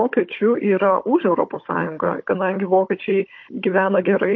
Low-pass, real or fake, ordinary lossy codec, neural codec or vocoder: 7.2 kHz; fake; MP3, 32 kbps; codec, 16 kHz, 16 kbps, FunCodec, trained on Chinese and English, 50 frames a second